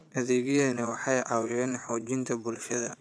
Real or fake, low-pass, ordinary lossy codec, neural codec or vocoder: fake; none; none; vocoder, 22.05 kHz, 80 mel bands, Vocos